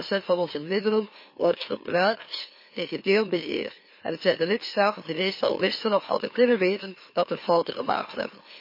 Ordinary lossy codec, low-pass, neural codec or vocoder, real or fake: MP3, 24 kbps; 5.4 kHz; autoencoder, 44.1 kHz, a latent of 192 numbers a frame, MeloTTS; fake